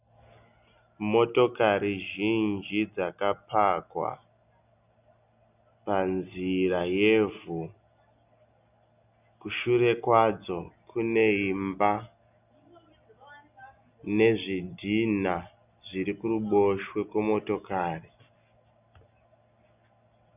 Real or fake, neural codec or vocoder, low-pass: real; none; 3.6 kHz